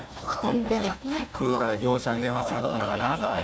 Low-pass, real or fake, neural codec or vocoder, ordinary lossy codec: none; fake; codec, 16 kHz, 1 kbps, FunCodec, trained on Chinese and English, 50 frames a second; none